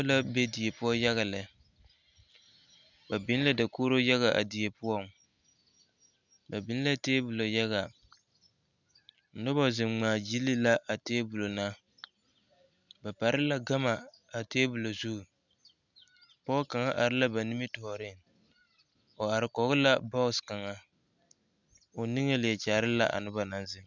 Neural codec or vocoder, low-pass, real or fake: none; 7.2 kHz; real